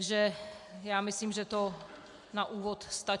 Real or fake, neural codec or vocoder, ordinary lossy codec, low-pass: real; none; MP3, 64 kbps; 10.8 kHz